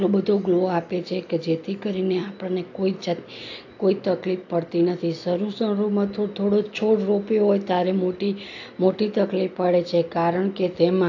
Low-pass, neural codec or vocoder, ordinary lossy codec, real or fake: 7.2 kHz; none; AAC, 48 kbps; real